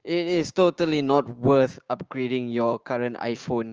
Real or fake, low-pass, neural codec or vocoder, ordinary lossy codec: fake; 7.2 kHz; codec, 16 kHz, 6 kbps, DAC; Opus, 24 kbps